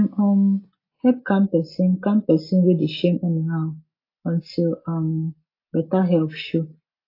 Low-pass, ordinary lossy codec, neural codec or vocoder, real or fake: 5.4 kHz; AAC, 32 kbps; none; real